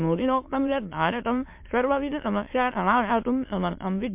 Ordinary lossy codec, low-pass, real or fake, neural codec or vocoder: MP3, 32 kbps; 3.6 kHz; fake; autoencoder, 22.05 kHz, a latent of 192 numbers a frame, VITS, trained on many speakers